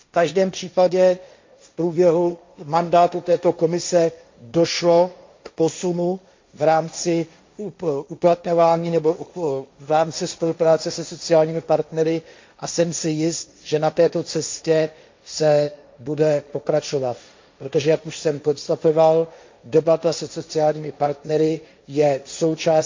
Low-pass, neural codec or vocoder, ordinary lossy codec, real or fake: 7.2 kHz; codec, 16 kHz, 1.1 kbps, Voila-Tokenizer; MP3, 48 kbps; fake